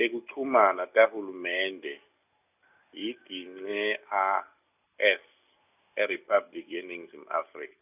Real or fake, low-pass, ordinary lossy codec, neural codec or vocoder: real; 3.6 kHz; none; none